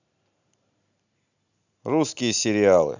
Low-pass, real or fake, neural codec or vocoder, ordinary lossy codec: 7.2 kHz; real; none; none